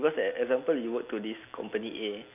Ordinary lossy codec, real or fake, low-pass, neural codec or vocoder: none; real; 3.6 kHz; none